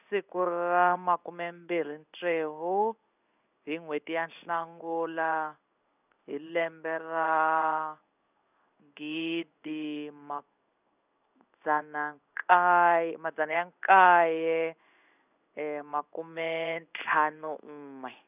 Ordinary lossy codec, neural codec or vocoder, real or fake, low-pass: none; codec, 16 kHz in and 24 kHz out, 1 kbps, XY-Tokenizer; fake; 3.6 kHz